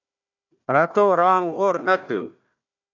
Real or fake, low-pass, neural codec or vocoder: fake; 7.2 kHz; codec, 16 kHz, 1 kbps, FunCodec, trained on Chinese and English, 50 frames a second